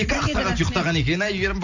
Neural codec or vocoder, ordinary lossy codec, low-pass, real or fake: none; none; 7.2 kHz; real